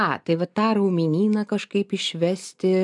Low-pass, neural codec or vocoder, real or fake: 10.8 kHz; none; real